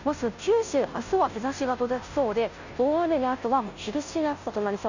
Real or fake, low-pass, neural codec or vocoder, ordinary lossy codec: fake; 7.2 kHz; codec, 16 kHz, 0.5 kbps, FunCodec, trained on Chinese and English, 25 frames a second; none